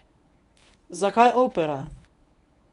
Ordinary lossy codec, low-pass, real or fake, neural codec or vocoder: none; 10.8 kHz; fake; codec, 24 kHz, 0.9 kbps, WavTokenizer, medium speech release version 1